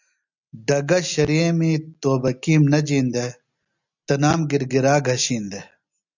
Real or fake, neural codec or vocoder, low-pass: real; none; 7.2 kHz